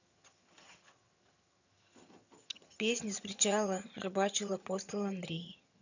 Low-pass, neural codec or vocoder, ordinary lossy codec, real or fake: 7.2 kHz; vocoder, 22.05 kHz, 80 mel bands, HiFi-GAN; none; fake